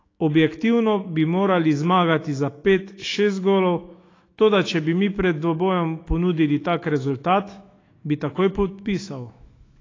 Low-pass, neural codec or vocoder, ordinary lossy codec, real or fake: 7.2 kHz; autoencoder, 48 kHz, 128 numbers a frame, DAC-VAE, trained on Japanese speech; AAC, 32 kbps; fake